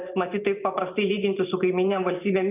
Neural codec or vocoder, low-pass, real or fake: vocoder, 44.1 kHz, 128 mel bands every 512 samples, BigVGAN v2; 3.6 kHz; fake